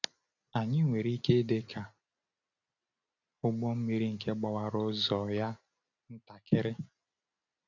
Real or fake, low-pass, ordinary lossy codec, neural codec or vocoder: real; 7.2 kHz; none; none